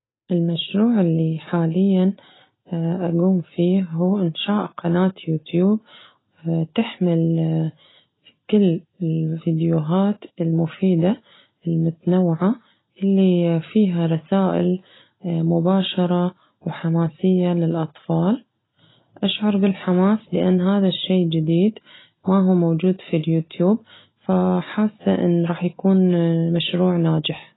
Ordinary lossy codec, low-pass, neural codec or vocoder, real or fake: AAC, 16 kbps; 7.2 kHz; none; real